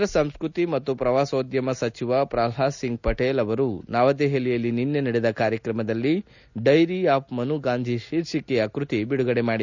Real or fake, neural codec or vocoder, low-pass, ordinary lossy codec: real; none; 7.2 kHz; none